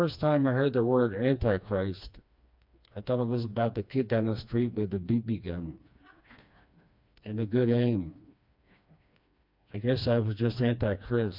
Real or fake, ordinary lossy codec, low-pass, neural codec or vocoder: fake; MP3, 48 kbps; 5.4 kHz; codec, 16 kHz, 2 kbps, FreqCodec, smaller model